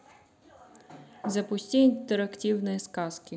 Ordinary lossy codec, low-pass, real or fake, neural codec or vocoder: none; none; real; none